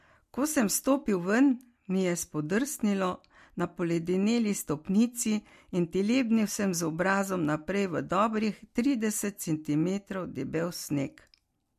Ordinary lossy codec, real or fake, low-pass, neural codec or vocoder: MP3, 64 kbps; real; 14.4 kHz; none